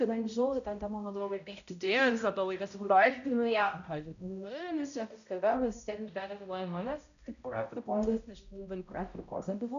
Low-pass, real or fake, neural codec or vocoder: 7.2 kHz; fake; codec, 16 kHz, 0.5 kbps, X-Codec, HuBERT features, trained on balanced general audio